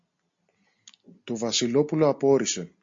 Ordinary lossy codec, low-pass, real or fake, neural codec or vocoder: MP3, 48 kbps; 7.2 kHz; real; none